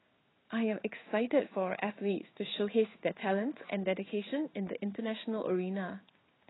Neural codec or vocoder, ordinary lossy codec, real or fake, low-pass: codec, 16 kHz in and 24 kHz out, 1 kbps, XY-Tokenizer; AAC, 16 kbps; fake; 7.2 kHz